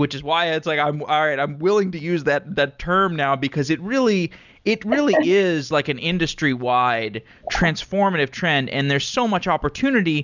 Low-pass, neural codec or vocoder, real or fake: 7.2 kHz; none; real